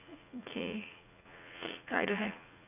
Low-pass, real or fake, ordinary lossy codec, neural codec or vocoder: 3.6 kHz; fake; none; vocoder, 44.1 kHz, 80 mel bands, Vocos